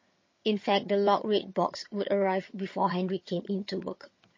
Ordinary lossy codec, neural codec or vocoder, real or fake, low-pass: MP3, 32 kbps; vocoder, 22.05 kHz, 80 mel bands, HiFi-GAN; fake; 7.2 kHz